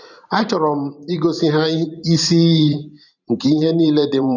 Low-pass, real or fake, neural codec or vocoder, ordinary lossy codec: 7.2 kHz; fake; vocoder, 44.1 kHz, 128 mel bands every 512 samples, BigVGAN v2; none